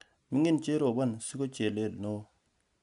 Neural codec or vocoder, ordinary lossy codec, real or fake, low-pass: none; none; real; 10.8 kHz